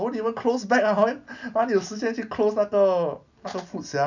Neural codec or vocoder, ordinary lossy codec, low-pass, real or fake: none; none; 7.2 kHz; real